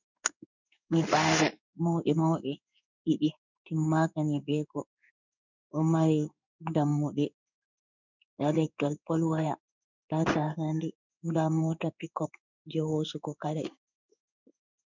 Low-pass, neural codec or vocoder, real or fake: 7.2 kHz; codec, 16 kHz in and 24 kHz out, 1 kbps, XY-Tokenizer; fake